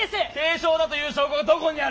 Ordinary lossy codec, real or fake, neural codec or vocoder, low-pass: none; real; none; none